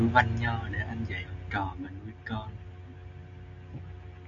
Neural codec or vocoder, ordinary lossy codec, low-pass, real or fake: none; AAC, 64 kbps; 7.2 kHz; real